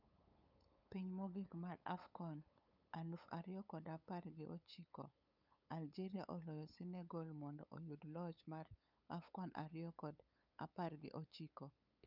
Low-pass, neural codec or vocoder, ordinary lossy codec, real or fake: 5.4 kHz; codec, 16 kHz, 8 kbps, FunCodec, trained on LibriTTS, 25 frames a second; none; fake